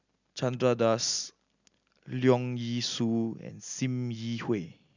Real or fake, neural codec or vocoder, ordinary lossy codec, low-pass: real; none; none; 7.2 kHz